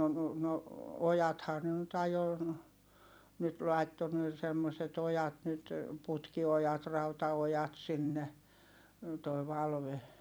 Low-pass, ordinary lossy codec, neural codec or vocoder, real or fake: none; none; vocoder, 44.1 kHz, 128 mel bands every 256 samples, BigVGAN v2; fake